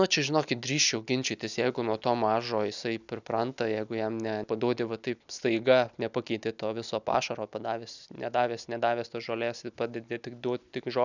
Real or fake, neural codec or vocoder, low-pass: real; none; 7.2 kHz